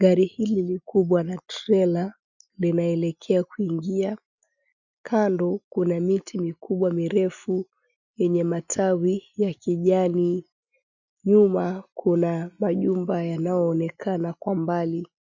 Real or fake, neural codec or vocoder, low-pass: real; none; 7.2 kHz